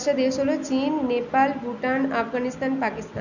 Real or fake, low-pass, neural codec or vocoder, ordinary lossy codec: real; 7.2 kHz; none; none